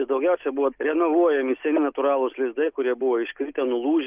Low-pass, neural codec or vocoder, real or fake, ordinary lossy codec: 3.6 kHz; none; real; Opus, 24 kbps